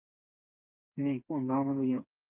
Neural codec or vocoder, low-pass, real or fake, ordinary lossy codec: codec, 16 kHz, 4 kbps, FreqCodec, smaller model; 3.6 kHz; fake; Opus, 24 kbps